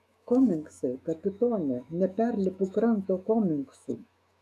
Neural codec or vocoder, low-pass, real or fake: autoencoder, 48 kHz, 128 numbers a frame, DAC-VAE, trained on Japanese speech; 14.4 kHz; fake